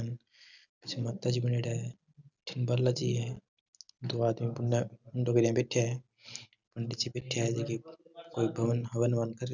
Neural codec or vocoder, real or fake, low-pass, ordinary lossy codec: none; real; 7.2 kHz; none